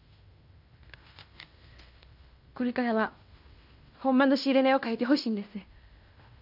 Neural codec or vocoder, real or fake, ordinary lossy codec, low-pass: codec, 16 kHz in and 24 kHz out, 0.9 kbps, LongCat-Audio-Codec, fine tuned four codebook decoder; fake; AAC, 48 kbps; 5.4 kHz